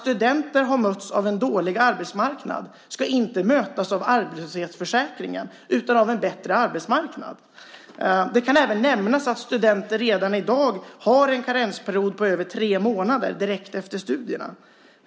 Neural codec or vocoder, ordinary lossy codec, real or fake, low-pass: none; none; real; none